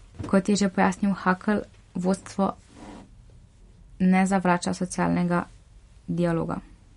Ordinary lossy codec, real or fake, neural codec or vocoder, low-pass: MP3, 48 kbps; real; none; 10.8 kHz